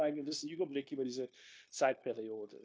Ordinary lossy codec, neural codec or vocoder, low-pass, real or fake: none; codec, 16 kHz, 0.9 kbps, LongCat-Audio-Codec; none; fake